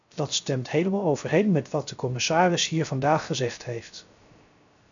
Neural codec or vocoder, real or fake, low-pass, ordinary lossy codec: codec, 16 kHz, 0.3 kbps, FocalCodec; fake; 7.2 kHz; MP3, 96 kbps